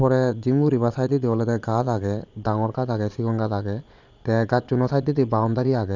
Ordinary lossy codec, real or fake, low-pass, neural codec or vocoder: none; fake; 7.2 kHz; vocoder, 22.05 kHz, 80 mel bands, Vocos